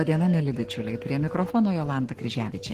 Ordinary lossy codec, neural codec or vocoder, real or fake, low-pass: Opus, 16 kbps; codec, 44.1 kHz, 7.8 kbps, Pupu-Codec; fake; 14.4 kHz